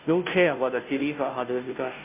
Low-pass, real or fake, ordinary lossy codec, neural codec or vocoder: 3.6 kHz; fake; MP3, 32 kbps; codec, 16 kHz, 0.5 kbps, FunCodec, trained on Chinese and English, 25 frames a second